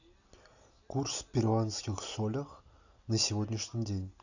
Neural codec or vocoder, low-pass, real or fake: none; 7.2 kHz; real